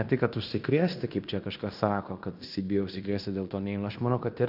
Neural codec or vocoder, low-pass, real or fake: codec, 16 kHz in and 24 kHz out, 0.9 kbps, LongCat-Audio-Codec, fine tuned four codebook decoder; 5.4 kHz; fake